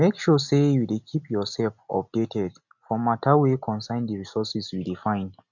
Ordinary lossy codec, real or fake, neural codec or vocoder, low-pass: none; real; none; 7.2 kHz